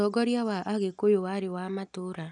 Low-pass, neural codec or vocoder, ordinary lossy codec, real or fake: 9.9 kHz; none; none; real